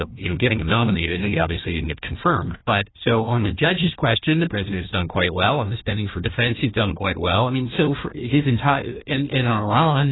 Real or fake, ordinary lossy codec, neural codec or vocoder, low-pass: fake; AAC, 16 kbps; codec, 16 kHz, 1 kbps, FunCodec, trained on Chinese and English, 50 frames a second; 7.2 kHz